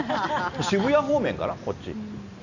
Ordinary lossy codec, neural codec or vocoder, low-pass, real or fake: none; none; 7.2 kHz; real